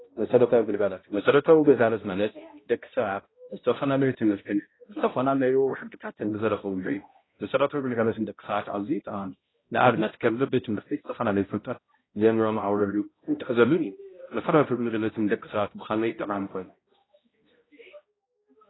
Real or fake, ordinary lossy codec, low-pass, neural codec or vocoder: fake; AAC, 16 kbps; 7.2 kHz; codec, 16 kHz, 0.5 kbps, X-Codec, HuBERT features, trained on balanced general audio